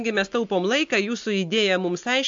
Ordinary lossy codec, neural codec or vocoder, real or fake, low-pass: AAC, 64 kbps; none; real; 7.2 kHz